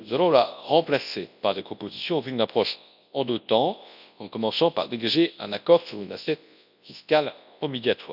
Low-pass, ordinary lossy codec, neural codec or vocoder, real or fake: 5.4 kHz; none; codec, 24 kHz, 0.9 kbps, WavTokenizer, large speech release; fake